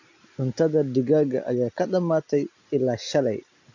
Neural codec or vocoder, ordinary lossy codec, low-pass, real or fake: none; AAC, 48 kbps; 7.2 kHz; real